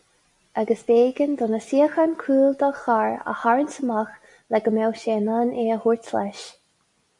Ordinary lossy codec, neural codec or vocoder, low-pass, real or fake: MP3, 64 kbps; none; 10.8 kHz; real